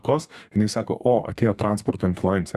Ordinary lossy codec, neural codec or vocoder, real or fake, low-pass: Opus, 64 kbps; codec, 32 kHz, 1.9 kbps, SNAC; fake; 14.4 kHz